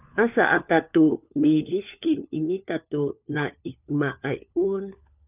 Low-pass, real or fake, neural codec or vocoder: 3.6 kHz; fake; codec, 16 kHz, 4 kbps, FunCodec, trained on LibriTTS, 50 frames a second